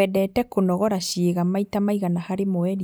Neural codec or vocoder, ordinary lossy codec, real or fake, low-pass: none; none; real; none